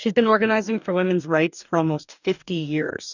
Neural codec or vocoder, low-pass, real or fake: codec, 44.1 kHz, 2.6 kbps, DAC; 7.2 kHz; fake